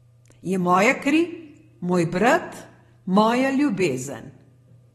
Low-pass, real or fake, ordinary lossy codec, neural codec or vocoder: 19.8 kHz; fake; AAC, 32 kbps; vocoder, 44.1 kHz, 128 mel bands every 512 samples, BigVGAN v2